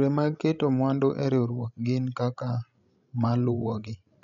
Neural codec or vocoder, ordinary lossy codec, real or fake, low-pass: codec, 16 kHz, 16 kbps, FreqCodec, larger model; none; fake; 7.2 kHz